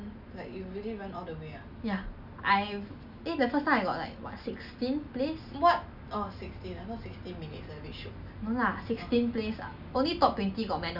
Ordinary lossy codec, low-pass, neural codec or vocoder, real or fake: none; 5.4 kHz; none; real